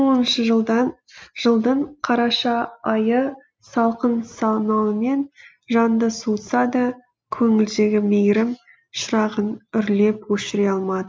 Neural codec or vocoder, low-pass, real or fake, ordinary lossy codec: none; none; real; none